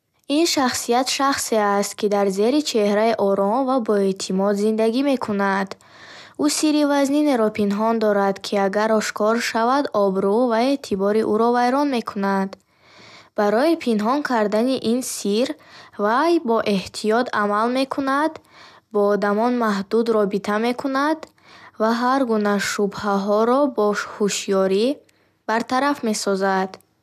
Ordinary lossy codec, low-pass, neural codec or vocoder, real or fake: none; 14.4 kHz; none; real